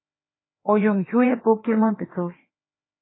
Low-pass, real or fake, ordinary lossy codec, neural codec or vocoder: 7.2 kHz; fake; AAC, 16 kbps; codec, 16 kHz, 2 kbps, FreqCodec, larger model